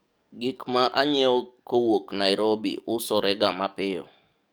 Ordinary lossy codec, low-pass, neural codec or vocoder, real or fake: none; none; codec, 44.1 kHz, 7.8 kbps, DAC; fake